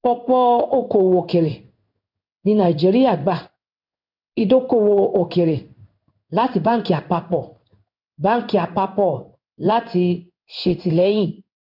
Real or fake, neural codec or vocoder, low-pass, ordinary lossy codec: fake; codec, 16 kHz in and 24 kHz out, 1 kbps, XY-Tokenizer; 5.4 kHz; none